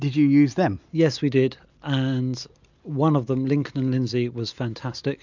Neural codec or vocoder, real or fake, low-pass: none; real; 7.2 kHz